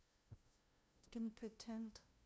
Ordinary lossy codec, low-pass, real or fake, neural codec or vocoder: none; none; fake; codec, 16 kHz, 0.5 kbps, FunCodec, trained on LibriTTS, 25 frames a second